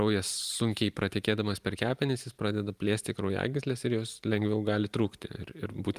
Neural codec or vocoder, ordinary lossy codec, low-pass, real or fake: vocoder, 44.1 kHz, 128 mel bands every 512 samples, BigVGAN v2; Opus, 32 kbps; 14.4 kHz; fake